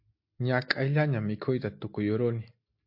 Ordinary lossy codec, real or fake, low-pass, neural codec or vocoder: MP3, 32 kbps; real; 5.4 kHz; none